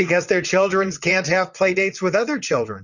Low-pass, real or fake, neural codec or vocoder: 7.2 kHz; fake; vocoder, 22.05 kHz, 80 mel bands, WaveNeXt